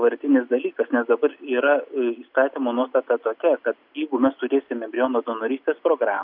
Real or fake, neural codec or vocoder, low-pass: real; none; 5.4 kHz